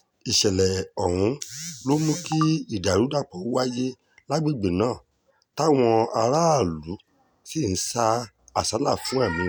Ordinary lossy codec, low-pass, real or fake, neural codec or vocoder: none; none; real; none